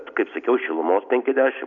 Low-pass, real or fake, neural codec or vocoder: 7.2 kHz; real; none